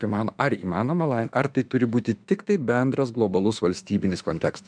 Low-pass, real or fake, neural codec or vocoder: 9.9 kHz; fake; autoencoder, 48 kHz, 32 numbers a frame, DAC-VAE, trained on Japanese speech